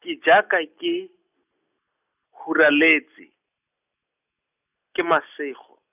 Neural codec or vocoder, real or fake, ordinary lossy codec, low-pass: none; real; none; 3.6 kHz